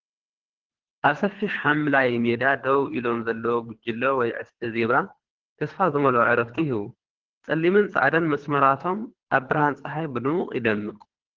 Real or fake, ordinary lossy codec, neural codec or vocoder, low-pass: fake; Opus, 16 kbps; codec, 24 kHz, 3 kbps, HILCodec; 7.2 kHz